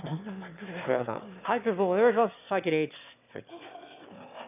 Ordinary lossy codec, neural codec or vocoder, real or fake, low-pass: none; autoencoder, 22.05 kHz, a latent of 192 numbers a frame, VITS, trained on one speaker; fake; 3.6 kHz